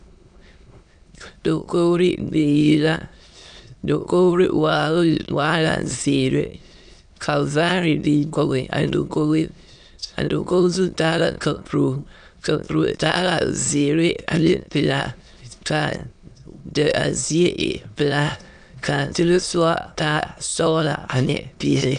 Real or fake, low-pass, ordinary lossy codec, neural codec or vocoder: fake; 9.9 kHz; AAC, 96 kbps; autoencoder, 22.05 kHz, a latent of 192 numbers a frame, VITS, trained on many speakers